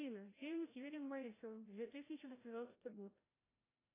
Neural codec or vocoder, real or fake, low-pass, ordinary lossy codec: codec, 16 kHz, 0.5 kbps, FreqCodec, larger model; fake; 3.6 kHz; AAC, 16 kbps